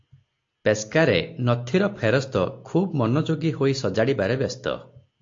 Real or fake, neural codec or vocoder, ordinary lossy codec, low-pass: real; none; AAC, 48 kbps; 7.2 kHz